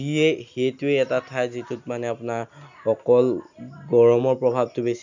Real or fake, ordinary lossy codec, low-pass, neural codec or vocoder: real; none; 7.2 kHz; none